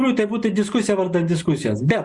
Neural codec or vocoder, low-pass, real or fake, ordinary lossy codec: none; 10.8 kHz; real; Opus, 64 kbps